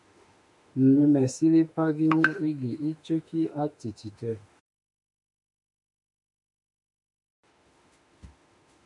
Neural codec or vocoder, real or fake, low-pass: autoencoder, 48 kHz, 32 numbers a frame, DAC-VAE, trained on Japanese speech; fake; 10.8 kHz